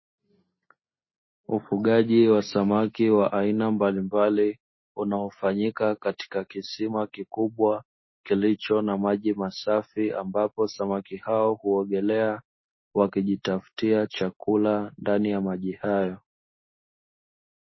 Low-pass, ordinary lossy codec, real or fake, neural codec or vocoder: 7.2 kHz; MP3, 24 kbps; real; none